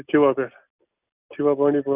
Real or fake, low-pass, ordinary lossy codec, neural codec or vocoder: real; 3.6 kHz; none; none